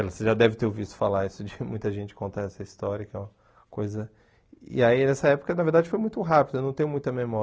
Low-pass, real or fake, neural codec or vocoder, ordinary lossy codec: none; real; none; none